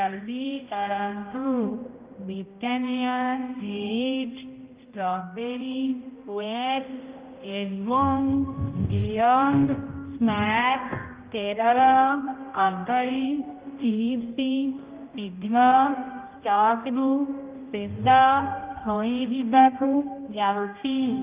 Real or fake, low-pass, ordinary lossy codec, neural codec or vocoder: fake; 3.6 kHz; Opus, 24 kbps; codec, 16 kHz, 0.5 kbps, X-Codec, HuBERT features, trained on general audio